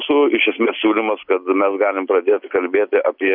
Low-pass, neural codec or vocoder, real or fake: 5.4 kHz; none; real